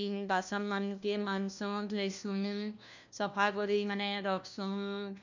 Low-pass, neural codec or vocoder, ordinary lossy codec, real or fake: 7.2 kHz; codec, 16 kHz, 1 kbps, FunCodec, trained on LibriTTS, 50 frames a second; none; fake